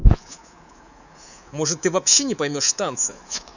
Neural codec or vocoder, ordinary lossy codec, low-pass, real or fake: codec, 24 kHz, 3.1 kbps, DualCodec; none; 7.2 kHz; fake